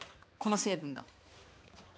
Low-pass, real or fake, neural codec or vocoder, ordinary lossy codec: none; fake; codec, 16 kHz, 2 kbps, X-Codec, HuBERT features, trained on balanced general audio; none